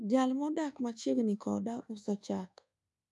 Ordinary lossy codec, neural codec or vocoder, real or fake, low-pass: none; codec, 24 kHz, 1.2 kbps, DualCodec; fake; none